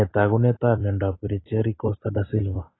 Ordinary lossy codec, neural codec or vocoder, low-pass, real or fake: AAC, 16 kbps; vocoder, 44.1 kHz, 128 mel bands every 256 samples, BigVGAN v2; 7.2 kHz; fake